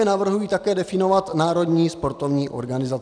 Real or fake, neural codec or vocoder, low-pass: real; none; 9.9 kHz